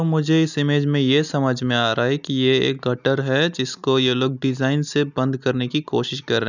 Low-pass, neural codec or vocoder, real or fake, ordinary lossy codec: 7.2 kHz; none; real; none